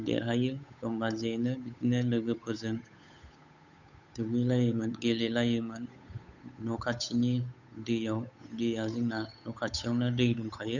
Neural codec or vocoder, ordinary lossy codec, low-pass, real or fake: codec, 16 kHz, 8 kbps, FunCodec, trained on Chinese and English, 25 frames a second; none; 7.2 kHz; fake